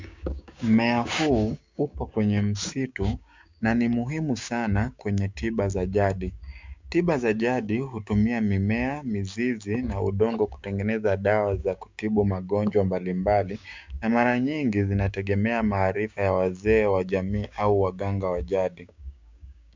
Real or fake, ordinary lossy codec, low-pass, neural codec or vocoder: fake; MP3, 64 kbps; 7.2 kHz; codec, 16 kHz, 6 kbps, DAC